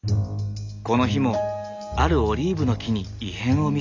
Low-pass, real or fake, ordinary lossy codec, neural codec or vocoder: 7.2 kHz; real; none; none